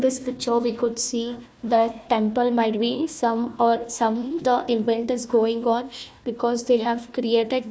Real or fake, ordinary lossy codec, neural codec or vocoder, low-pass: fake; none; codec, 16 kHz, 1 kbps, FunCodec, trained on Chinese and English, 50 frames a second; none